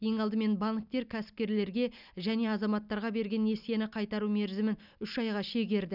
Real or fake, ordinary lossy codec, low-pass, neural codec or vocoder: real; none; 5.4 kHz; none